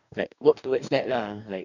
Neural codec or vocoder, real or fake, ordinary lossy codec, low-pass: codec, 44.1 kHz, 2.6 kbps, DAC; fake; none; 7.2 kHz